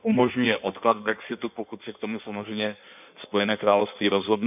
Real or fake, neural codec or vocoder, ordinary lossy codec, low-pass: fake; codec, 16 kHz in and 24 kHz out, 1.1 kbps, FireRedTTS-2 codec; none; 3.6 kHz